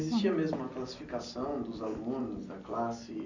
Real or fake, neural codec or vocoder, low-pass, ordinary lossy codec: real; none; 7.2 kHz; none